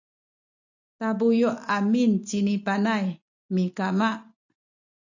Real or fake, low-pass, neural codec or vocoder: real; 7.2 kHz; none